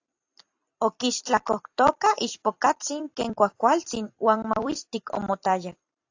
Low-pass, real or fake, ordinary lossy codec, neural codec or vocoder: 7.2 kHz; fake; AAC, 48 kbps; vocoder, 44.1 kHz, 128 mel bands every 256 samples, BigVGAN v2